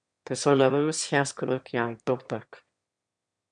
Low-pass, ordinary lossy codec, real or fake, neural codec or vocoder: 9.9 kHz; MP3, 64 kbps; fake; autoencoder, 22.05 kHz, a latent of 192 numbers a frame, VITS, trained on one speaker